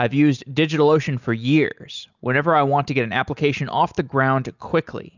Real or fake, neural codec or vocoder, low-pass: real; none; 7.2 kHz